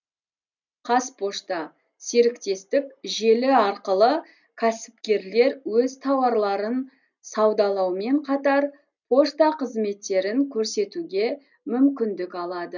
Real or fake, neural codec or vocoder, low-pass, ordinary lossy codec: real; none; 7.2 kHz; none